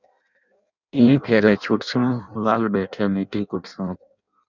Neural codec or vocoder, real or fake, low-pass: codec, 16 kHz in and 24 kHz out, 0.6 kbps, FireRedTTS-2 codec; fake; 7.2 kHz